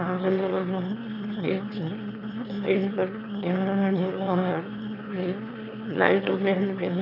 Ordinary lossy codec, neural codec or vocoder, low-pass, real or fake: none; autoencoder, 22.05 kHz, a latent of 192 numbers a frame, VITS, trained on one speaker; 5.4 kHz; fake